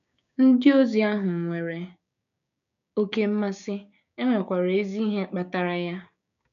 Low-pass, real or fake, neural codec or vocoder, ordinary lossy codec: 7.2 kHz; fake; codec, 16 kHz, 6 kbps, DAC; none